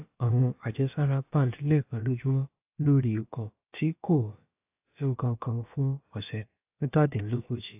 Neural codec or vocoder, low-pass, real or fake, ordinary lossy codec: codec, 16 kHz, about 1 kbps, DyCAST, with the encoder's durations; 3.6 kHz; fake; AAC, 32 kbps